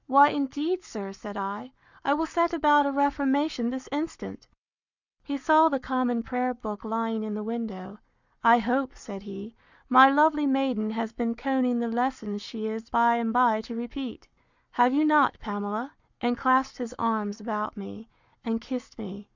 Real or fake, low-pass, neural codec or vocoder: fake; 7.2 kHz; codec, 44.1 kHz, 7.8 kbps, Pupu-Codec